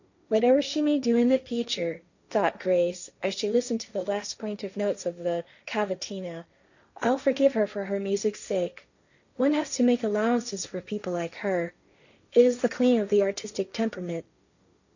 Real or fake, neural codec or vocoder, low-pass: fake; codec, 16 kHz, 1.1 kbps, Voila-Tokenizer; 7.2 kHz